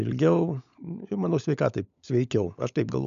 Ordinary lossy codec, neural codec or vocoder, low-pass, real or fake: MP3, 96 kbps; codec, 16 kHz, 16 kbps, FunCodec, trained on LibriTTS, 50 frames a second; 7.2 kHz; fake